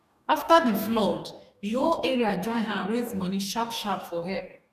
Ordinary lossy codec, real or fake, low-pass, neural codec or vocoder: none; fake; 14.4 kHz; codec, 44.1 kHz, 2.6 kbps, DAC